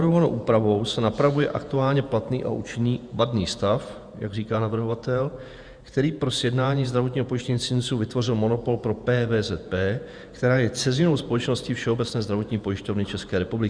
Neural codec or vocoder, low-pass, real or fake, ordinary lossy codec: vocoder, 48 kHz, 128 mel bands, Vocos; 9.9 kHz; fake; Opus, 64 kbps